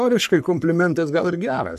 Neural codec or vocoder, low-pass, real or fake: codec, 44.1 kHz, 3.4 kbps, Pupu-Codec; 14.4 kHz; fake